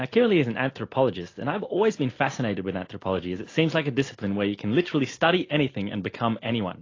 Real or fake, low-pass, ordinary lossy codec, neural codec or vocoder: real; 7.2 kHz; AAC, 32 kbps; none